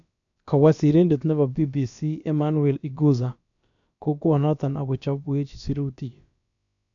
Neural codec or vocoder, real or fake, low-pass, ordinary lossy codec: codec, 16 kHz, about 1 kbps, DyCAST, with the encoder's durations; fake; 7.2 kHz; none